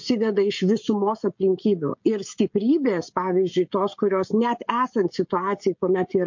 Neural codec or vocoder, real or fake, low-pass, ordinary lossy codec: codec, 16 kHz, 16 kbps, FreqCodec, smaller model; fake; 7.2 kHz; MP3, 48 kbps